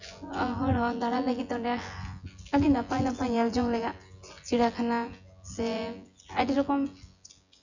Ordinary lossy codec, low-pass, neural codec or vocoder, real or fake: none; 7.2 kHz; vocoder, 24 kHz, 100 mel bands, Vocos; fake